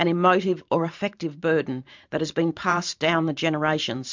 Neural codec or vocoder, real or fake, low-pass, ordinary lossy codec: vocoder, 44.1 kHz, 128 mel bands every 512 samples, BigVGAN v2; fake; 7.2 kHz; MP3, 64 kbps